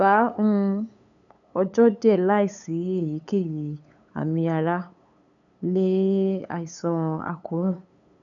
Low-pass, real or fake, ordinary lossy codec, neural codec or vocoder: 7.2 kHz; fake; none; codec, 16 kHz, 8 kbps, FunCodec, trained on LibriTTS, 25 frames a second